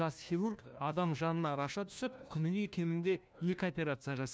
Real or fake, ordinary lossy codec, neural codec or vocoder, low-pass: fake; none; codec, 16 kHz, 1 kbps, FunCodec, trained on LibriTTS, 50 frames a second; none